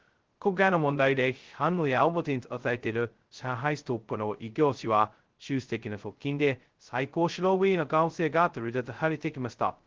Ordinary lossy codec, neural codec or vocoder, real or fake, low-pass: Opus, 16 kbps; codec, 16 kHz, 0.2 kbps, FocalCodec; fake; 7.2 kHz